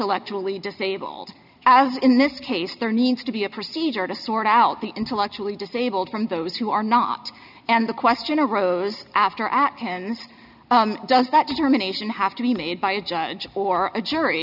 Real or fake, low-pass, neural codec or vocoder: real; 5.4 kHz; none